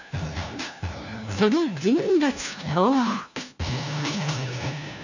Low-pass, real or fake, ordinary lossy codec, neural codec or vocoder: 7.2 kHz; fake; none; codec, 16 kHz, 1 kbps, FunCodec, trained on LibriTTS, 50 frames a second